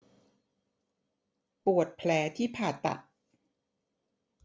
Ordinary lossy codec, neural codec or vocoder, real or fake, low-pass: none; none; real; none